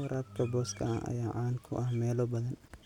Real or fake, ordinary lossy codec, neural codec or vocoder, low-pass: real; none; none; 19.8 kHz